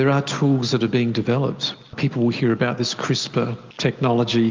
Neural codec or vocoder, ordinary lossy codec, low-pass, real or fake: none; Opus, 24 kbps; 7.2 kHz; real